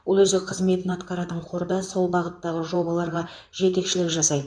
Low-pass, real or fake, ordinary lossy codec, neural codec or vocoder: 9.9 kHz; fake; MP3, 96 kbps; codec, 16 kHz in and 24 kHz out, 2.2 kbps, FireRedTTS-2 codec